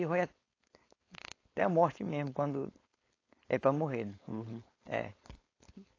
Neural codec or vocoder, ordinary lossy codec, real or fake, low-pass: codec, 16 kHz, 4.8 kbps, FACodec; AAC, 32 kbps; fake; 7.2 kHz